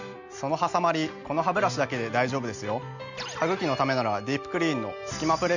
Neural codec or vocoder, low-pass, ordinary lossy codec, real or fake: none; 7.2 kHz; none; real